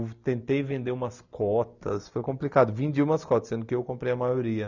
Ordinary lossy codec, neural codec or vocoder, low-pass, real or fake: none; none; 7.2 kHz; real